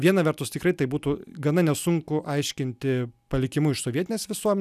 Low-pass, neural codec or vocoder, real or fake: 14.4 kHz; none; real